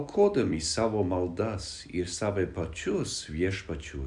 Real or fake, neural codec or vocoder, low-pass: real; none; 10.8 kHz